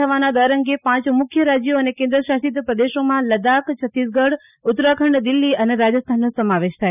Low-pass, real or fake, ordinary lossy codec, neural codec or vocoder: 3.6 kHz; real; none; none